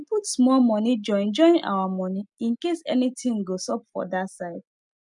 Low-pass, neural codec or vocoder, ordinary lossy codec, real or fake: 10.8 kHz; none; none; real